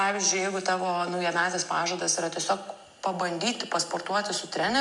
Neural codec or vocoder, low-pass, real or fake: none; 10.8 kHz; real